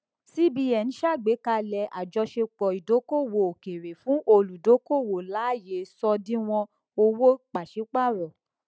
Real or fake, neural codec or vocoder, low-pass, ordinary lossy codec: real; none; none; none